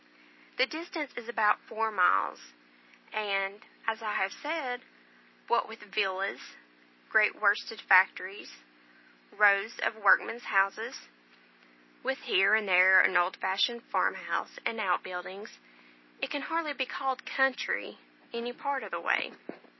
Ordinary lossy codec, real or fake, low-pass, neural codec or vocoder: MP3, 24 kbps; real; 7.2 kHz; none